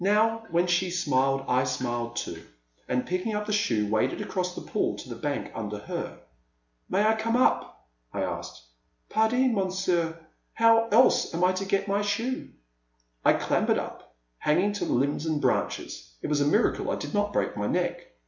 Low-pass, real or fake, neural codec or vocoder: 7.2 kHz; real; none